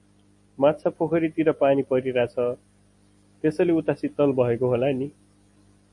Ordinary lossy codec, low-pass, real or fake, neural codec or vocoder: MP3, 48 kbps; 10.8 kHz; real; none